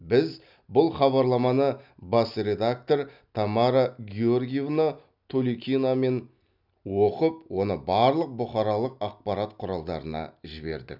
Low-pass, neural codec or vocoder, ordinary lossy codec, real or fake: 5.4 kHz; none; none; real